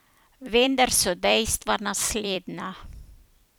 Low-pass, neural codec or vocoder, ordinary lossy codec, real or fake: none; vocoder, 44.1 kHz, 128 mel bands every 256 samples, BigVGAN v2; none; fake